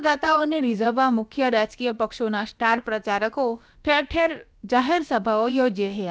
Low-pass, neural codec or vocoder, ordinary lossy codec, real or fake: none; codec, 16 kHz, about 1 kbps, DyCAST, with the encoder's durations; none; fake